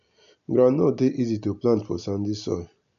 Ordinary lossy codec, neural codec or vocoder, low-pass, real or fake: none; none; 7.2 kHz; real